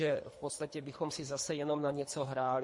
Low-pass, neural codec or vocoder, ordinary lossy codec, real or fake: 10.8 kHz; codec, 24 kHz, 3 kbps, HILCodec; MP3, 48 kbps; fake